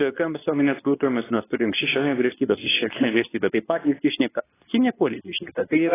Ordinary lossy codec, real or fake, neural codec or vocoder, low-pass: AAC, 16 kbps; fake; codec, 24 kHz, 0.9 kbps, WavTokenizer, medium speech release version 2; 3.6 kHz